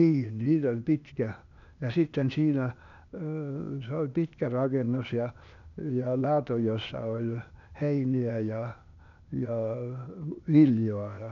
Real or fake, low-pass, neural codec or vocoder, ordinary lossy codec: fake; 7.2 kHz; codec, 16 kHz, 0.8 kbps, ZipCodec; none